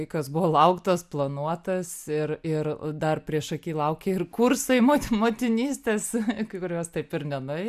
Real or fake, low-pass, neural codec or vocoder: real; 14.4 kHz; none